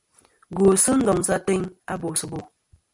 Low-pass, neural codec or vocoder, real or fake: 10.8 kHz; none; real